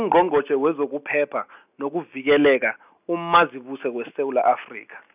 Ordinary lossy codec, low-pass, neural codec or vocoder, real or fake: none; 3.6 kHz; none; real